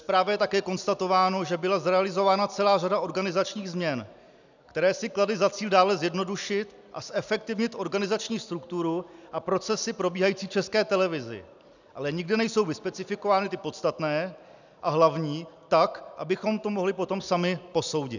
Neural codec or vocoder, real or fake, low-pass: none; real; 7.2 kHz